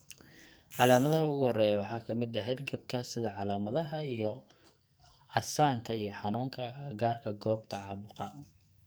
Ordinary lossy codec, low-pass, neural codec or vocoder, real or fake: none; none; codec, 44.1 kHz, 2.6 kbps, SNAC; fake